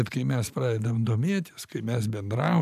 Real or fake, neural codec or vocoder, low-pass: fake; vocoder, 44.1 kHz, 128 mel bands every 512 samples, BigVGAN v2; 14.4 kHz